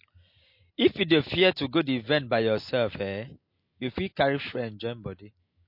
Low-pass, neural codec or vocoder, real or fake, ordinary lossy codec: 5.4 kHz; none; real; MP3, 32 kbps